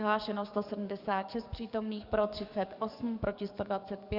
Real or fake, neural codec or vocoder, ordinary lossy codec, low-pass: fake; codec, 44.1 kHz, 7.8 kbps, DAC; Opus, 64 kbps; 5.4 kHz